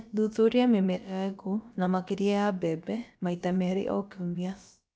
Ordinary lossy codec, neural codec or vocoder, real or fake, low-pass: none; codec, 16 kHz, about 1 kbps, DyCAST, with the encoder's durations; fake; none